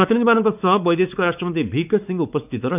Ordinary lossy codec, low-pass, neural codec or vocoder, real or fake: none; 3.6 kHz; codec, 16 kHz, 4 kbps, X-Codec, WavLM features, trained on Multilingual LibriSpeech; fake